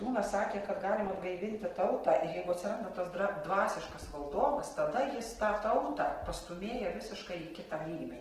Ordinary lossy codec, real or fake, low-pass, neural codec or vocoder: Opus, 16 kbps; real; 14.4 kHz; none